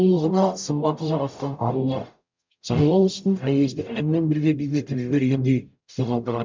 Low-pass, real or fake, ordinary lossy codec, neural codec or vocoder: 7.2 kHz; fake; MP3, 64 kbps; codec, 44.1 kHz, 0.9 kbps, DAC